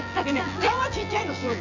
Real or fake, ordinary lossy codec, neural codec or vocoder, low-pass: fake; none; vocoder, 24 kHz, 100 mel bands, Vocos; 7.2 kHz